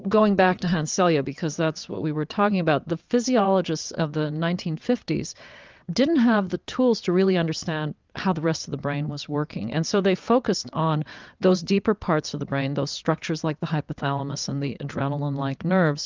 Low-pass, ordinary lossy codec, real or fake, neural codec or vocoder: 7.2 kHz; Opus, 24 kbps; fake; vocoder, 22.05 kHz, 80 mel bands, WaveNeXt